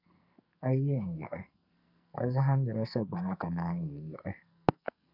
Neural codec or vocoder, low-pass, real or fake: codec, 44.1 kHz, 2.6 kbps, SNAC; 5.4 kHz; fake